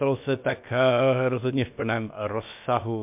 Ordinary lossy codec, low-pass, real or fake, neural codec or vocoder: MP3, 32 kbps; 3.6 kHz; fake; codec, 16 kHz, about 1 kbps, DyCAST, with the encoder's durations